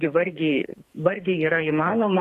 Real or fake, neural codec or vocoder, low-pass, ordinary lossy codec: fake; codec, 44.1 kHz, 2.6 kbps, SNAC; 14.4 kHz; AAC, 48 kbps